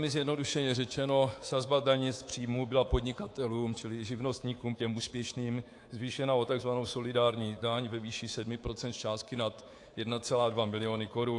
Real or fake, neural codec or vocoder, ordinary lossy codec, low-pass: fake; codec, 24 kHz, 3.1 kbps, DualCodec; AAC, 48 kbps; 10.8 kHz